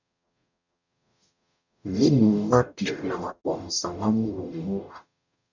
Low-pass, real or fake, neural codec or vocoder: 7.2 kHz; fake; codec, 44.1 kHz, 0.9 kbps, DAC